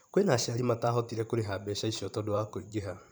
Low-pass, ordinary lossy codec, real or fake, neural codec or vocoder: none; none; fake; vocoder, 44.1 kHz, 128 mel bands every 512 samples, BigVGAN v2